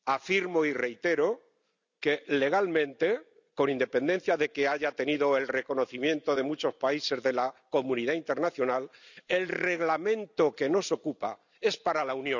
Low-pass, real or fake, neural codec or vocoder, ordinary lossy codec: 7.2 kHz; real; none; none